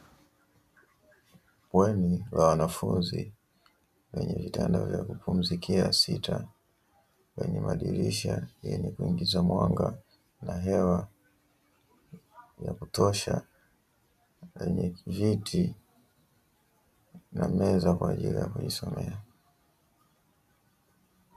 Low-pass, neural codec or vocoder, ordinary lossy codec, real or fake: 14.4 kHz; none; AAC, 96 kbps; real